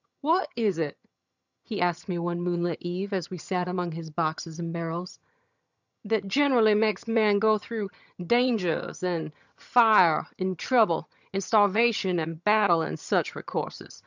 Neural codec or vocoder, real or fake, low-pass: vocoder, 22.05 kHz, 80 mel bands, HiFi-GAN; fake; 7.2 kHz